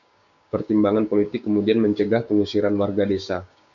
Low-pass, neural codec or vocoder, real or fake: 7.2 kHz; codec, 16 kHz, 6 kbps, DAC; fake